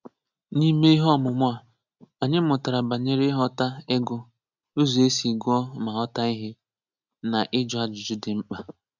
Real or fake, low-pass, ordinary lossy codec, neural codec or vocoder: real; 7.2 kHz; none; none